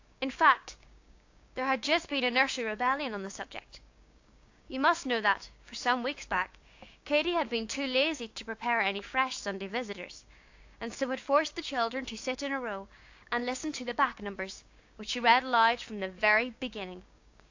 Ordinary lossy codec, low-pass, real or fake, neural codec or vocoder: AAC, 48 kbps; 7.2 kHz; fake; codec, 16 kHz, 6 kbps, DAC